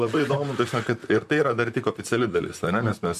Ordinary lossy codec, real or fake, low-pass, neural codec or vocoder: MP3, 96 kbps; fake; 14.4 kHz; vocoder, 44.1 kHz, 128 mel bands, Pupu-Vocoder